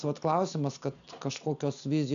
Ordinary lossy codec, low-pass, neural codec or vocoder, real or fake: AAC, 96 kbps; 7.2 kHz; none; real